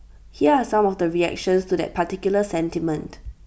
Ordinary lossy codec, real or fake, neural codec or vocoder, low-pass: none; real; none; none